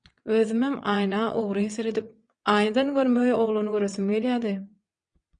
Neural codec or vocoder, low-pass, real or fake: vocoder, 22.05 kHz, 80 mel bands, WaveNeXt; 9.9 kHz; fake